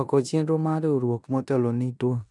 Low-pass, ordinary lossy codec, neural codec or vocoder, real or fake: 10.8 kHz; none; codec, 16 kHz in and 24 kHz out, 0.9 kbps, LongCat-Audio-Codec, four codebook decoder; fake